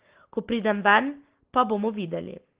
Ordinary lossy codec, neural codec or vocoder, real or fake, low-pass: Opus, 16 kbps; none; real; 3.6 kHz